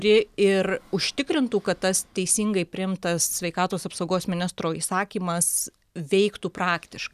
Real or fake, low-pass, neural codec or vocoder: real; 14.4 kHz; none